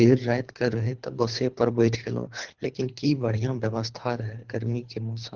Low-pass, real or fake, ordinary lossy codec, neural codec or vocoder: 7.2 kHz; fake; Opus, 16 kbps; codec, 24 kHz, 3 kbps, HILCodec